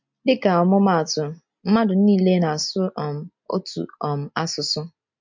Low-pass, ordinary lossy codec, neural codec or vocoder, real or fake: 7.2 kHz; MP3, 48 kbps; none; real